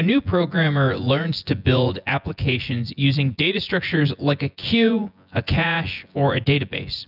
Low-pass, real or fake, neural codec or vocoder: 5.4 kHz; fake; vocoder, 24 kHz, 100 mel bands, Vocos